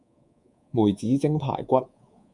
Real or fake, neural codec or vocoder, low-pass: fake; codec, 24 kHz, 3.1 kbps, DualCodec; 10.8 kHz